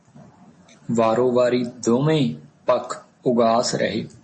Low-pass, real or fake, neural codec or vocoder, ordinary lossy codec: 10.8 kHz; real; none; MP3, 32 kbps